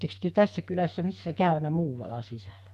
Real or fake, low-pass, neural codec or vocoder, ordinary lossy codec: fake; 14.4 kHz; codec, 44.1 kHz, 2.6 kbps, SNAC; none